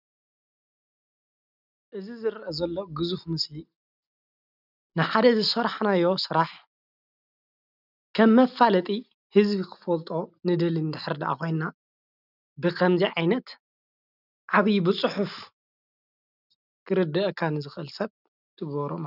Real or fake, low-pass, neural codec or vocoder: real; 5.4 kHz; none